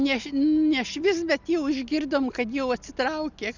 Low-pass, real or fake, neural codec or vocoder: 7.2 kHz; real; none